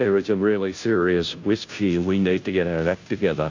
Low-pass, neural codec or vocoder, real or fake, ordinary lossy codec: 7.2 kHz; codec, 16 kHz, 0.5 kbps, FunCodec, trained on Chinese and English, 25 frames a second; fake; AAC, 48 kbps